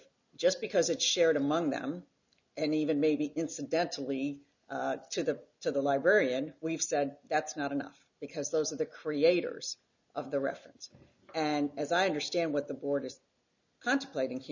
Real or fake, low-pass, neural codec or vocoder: real; 7.2 kHz; none